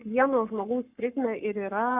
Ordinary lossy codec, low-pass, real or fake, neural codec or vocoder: Opus, 64 kbps; 3.6 kHz; fake; codec, 44.1 kHz, 7.8 kbps, Pupu-Codec